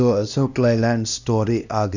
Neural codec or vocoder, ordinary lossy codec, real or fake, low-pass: codec, 16 kHz, 1 kbps, X-Codec, HuBERT features, trained on LibriSpeech; none; fake; 7.2 kHz